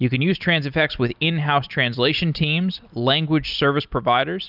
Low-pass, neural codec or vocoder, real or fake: 5.4 kHz; none; real